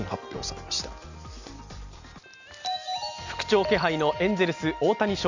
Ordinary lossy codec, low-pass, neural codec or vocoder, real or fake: none; 7.2 kHz; none; real